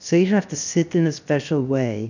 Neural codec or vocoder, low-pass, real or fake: codec, 16 kHz, 0.7 kbps, FocalCodec; 7.2 kHz; fake